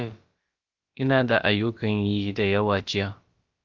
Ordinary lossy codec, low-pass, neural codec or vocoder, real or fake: Opus, 32 kbps; 7.2 kHz; codec, 16 kHz, about 1 kbps, DyCAST, with the encoder's durations; fake